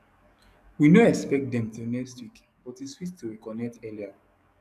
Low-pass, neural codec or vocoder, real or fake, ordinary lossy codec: 14.4 kHz; codec, 44.1 kHz, 7.8 kbps, DAC; fake; none